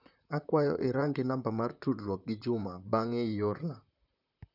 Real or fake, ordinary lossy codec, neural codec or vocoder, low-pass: fake; none; vocoder, 44.1 kHz, 128 mel bands, Pupu-Vocoder; 5.4 kHz